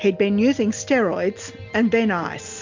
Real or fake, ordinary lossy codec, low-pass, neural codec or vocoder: real; MP3, 64 kbps; 7.2 kHz; none